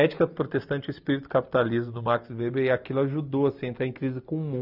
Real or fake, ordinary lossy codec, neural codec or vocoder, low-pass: real; none; none; 5.4 kHz